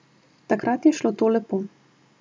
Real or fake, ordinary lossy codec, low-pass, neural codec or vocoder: real; none; none; none